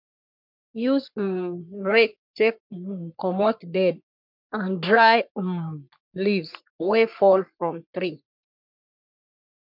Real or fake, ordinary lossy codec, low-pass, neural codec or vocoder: fake; MP3, 48 kbps; 5.4 kHz; codec, 44.1 kHz, 3.4 kbps, Pupu-Codec